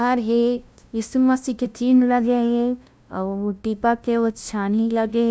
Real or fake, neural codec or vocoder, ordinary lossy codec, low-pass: fake; codec, 16 kHz, 0.5 kbps, FunCodec, trained on LibriTTS, 25 frames a second; none; none